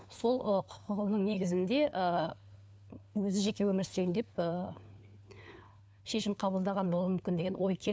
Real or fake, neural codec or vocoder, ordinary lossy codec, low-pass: fake; codec, 16 kHz, 4 kbps, FunCodec, trained on LibriTTS, 50 frames a second; none; none